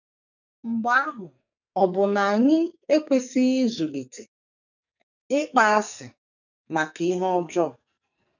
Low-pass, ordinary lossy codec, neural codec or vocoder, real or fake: 7.2 kHz; none; codec, 44.1 kHz, 2.6 kbps, SNAC; fake